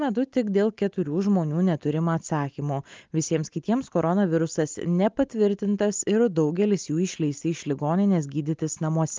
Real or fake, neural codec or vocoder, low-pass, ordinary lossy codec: real; none; 7.2 kHz; Opus, 24 kbps